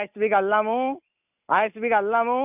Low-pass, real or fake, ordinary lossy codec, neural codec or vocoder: 3.6 kHz; real; none; none